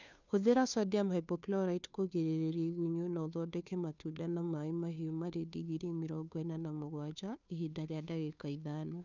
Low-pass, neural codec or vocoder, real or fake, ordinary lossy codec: 7.2 kHz; codec, 16 kHz, 2 kbps, FunCodec, trained on Chinese and English, 25 frames a second; fake; none